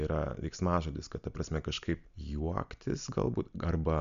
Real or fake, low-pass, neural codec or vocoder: real; 7.2 kHz; none